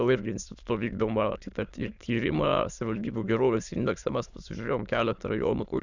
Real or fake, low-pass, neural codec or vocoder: fake; 7.2 kHz; autoencoder, 22.05 kHz, a latent of 192 numbers a frame, VITS, trained on many speakers